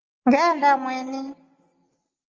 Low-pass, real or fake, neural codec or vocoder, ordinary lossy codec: 7.2 kHz; real; none; Opus, 32 kbps